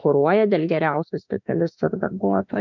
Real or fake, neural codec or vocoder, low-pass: fake; autoencoder, 48 kHz, 32 numbers a frame, DAC-VAE, trained on Japanese speech; 7.2 kHz